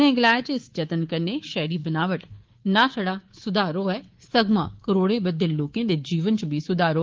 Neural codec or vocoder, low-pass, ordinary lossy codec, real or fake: codec, 24 kHz, 3.1 kbps, DualCodec; 7.2 kHz; Opus, 16 kbps; fake